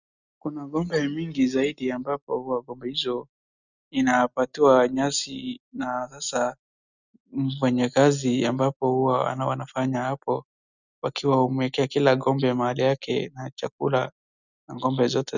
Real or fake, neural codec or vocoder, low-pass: real; none; 7.2 kHz